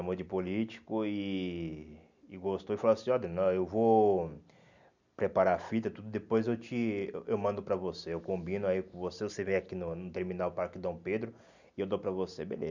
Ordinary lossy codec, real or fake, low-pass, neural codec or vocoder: none; real; 7.2 kHz; none